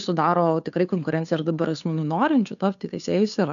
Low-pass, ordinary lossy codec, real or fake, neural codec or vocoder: 7.2 kHz; MP3, 96 kbps; fake; codec, 16 kHz, 2 kbps, FunCodec, trained on Chinese and English, 25 frames a second